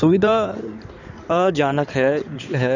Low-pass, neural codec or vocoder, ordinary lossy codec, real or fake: 7.2 kHz; codec, 16 kHz in and 24 kHz out, 2.2 kbps, FireRedTTS-2 codec; none; fake